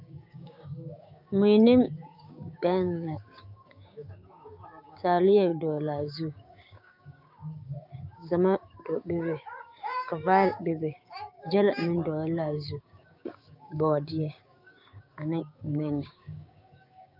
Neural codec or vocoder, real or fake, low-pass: codec, 16 kHz, 6 kbps, DAC; fake; 5.4 kHz